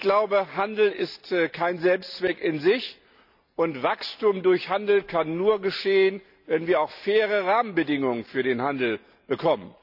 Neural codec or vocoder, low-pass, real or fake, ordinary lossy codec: none; 5.4 kHz; real; none